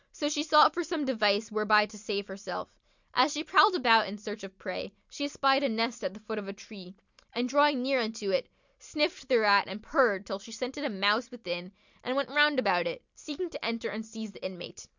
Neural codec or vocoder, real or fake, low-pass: none; real; 7.2 kHz